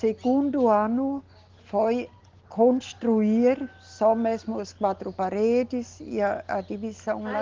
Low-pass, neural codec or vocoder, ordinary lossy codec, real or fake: 7.2 kHz; none; Opus, 16 kbps; real